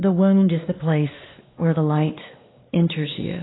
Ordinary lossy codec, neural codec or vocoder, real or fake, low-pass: AAC, 16 kbps; codec, 16 kHz, 2 kbps, X-Codec, WavLM features, trained on Multilingual LibriSpeech; fake; 7.2 kHz